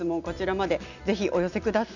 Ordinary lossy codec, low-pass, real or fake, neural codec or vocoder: none; 7.2 kHz; real; none